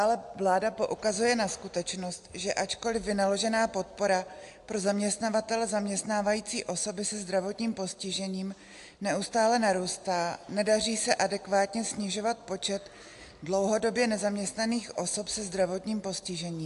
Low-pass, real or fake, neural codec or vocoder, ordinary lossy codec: 10.8 kHz; real; none; AAC, 64 kbps